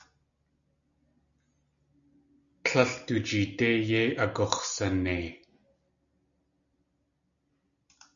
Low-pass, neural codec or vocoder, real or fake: 7.2 kHz; none; real